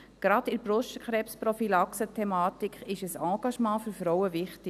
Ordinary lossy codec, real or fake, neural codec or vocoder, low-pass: none; real; none; 14.4 kHz